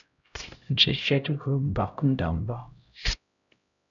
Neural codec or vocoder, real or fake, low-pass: codec, 16 kHz, 0.5 kbps, X-Codec, HuBERT features, trained on LibriSpeech; fake; 7.2 kHz